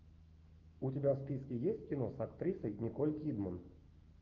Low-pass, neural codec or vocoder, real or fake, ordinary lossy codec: 7.2 kHz; autoencoder, 48 kHz, 128 numbers a frame, DAC-VAE, trained on Japanese speech; fake; Opus, 32 kbps